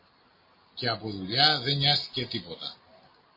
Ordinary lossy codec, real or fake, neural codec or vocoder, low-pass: MP3, 24 kbps; real; none; 5.4 kHz